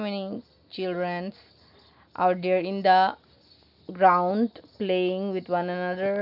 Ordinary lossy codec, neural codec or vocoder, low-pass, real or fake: none; none; 5.4 kHz; real